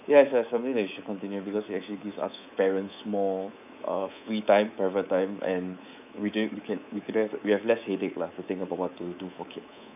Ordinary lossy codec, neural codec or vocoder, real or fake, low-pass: none; codec, 24 kHz, 3.1 kbps, DualCodec; fake; 3.6 kHz